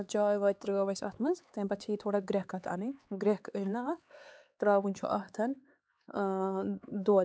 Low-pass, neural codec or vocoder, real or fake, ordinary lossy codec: none; codec, 16 kHz, 4 kbps, X-Codec, HuBERT features, trained on LibriSpeech; fake; none